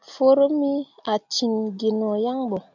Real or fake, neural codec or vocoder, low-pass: real; none; 7.2 kHz